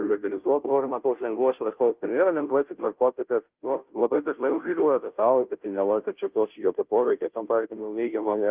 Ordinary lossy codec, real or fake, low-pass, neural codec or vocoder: Opus, 32 kbps; fake; 3.6 kHz; codec, 16 kHz, 0.5 kbps, FunCodec, trained on Chinese and English, 25 frames a second